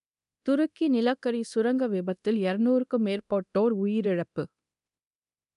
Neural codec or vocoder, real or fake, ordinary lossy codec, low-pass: codec, 24 kHz, 0.9 kbps, DualCodec; fake; none; 10.8 kHz